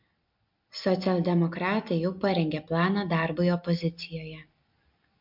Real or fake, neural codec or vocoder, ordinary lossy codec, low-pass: real; none; MP3, 48 kbps; 5.4 kHz